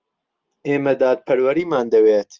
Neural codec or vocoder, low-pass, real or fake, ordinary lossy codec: none; 7.2 kHz; real; Opus, 32 kbps